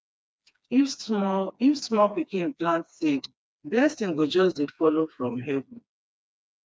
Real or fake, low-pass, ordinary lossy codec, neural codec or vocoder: fake; none; none; codec, 16 kHz, 2 kbps, FreqCodec, smaller model